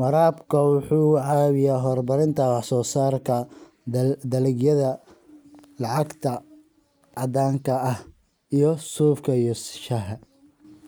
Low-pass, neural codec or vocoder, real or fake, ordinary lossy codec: none; none; real; none